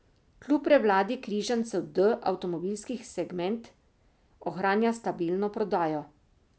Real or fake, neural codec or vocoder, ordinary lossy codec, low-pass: real; none; none; none